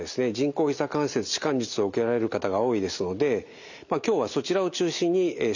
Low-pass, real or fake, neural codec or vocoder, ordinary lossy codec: 7.2 kHz; real; none; none